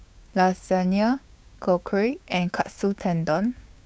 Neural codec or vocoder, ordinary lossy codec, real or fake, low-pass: codec, 16 kHz, 6 kbps, DAC; none; fake; none